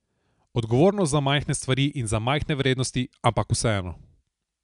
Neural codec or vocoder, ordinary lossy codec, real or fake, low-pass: none; none; real; 10.8 kHz